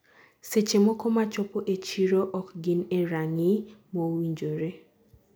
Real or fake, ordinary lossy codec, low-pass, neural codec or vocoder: real; none; none; none